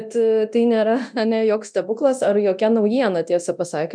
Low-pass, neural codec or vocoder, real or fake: 9.9 kHz; codec, 24 kHz, 0.9 kbps, DualCodec; fake